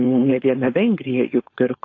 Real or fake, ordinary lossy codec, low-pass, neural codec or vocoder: fake; AAC, 32 kbps; 7.2 kHz; codec, 16 kHz, 4.8 kbps, FACodec